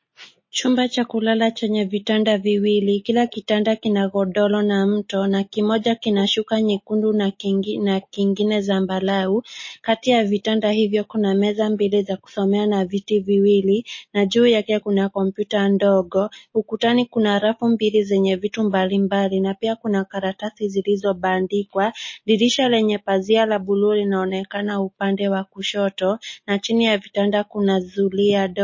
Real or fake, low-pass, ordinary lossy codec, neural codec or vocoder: real; 7.2 kHz; MP3, 32 kbps; none